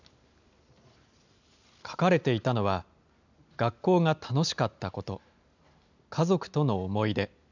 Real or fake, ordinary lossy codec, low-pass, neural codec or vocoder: real; none; 7.2 kHz; none